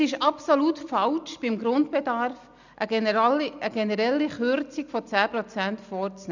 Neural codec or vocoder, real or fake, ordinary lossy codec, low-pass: none; real; none; 7.2 kHz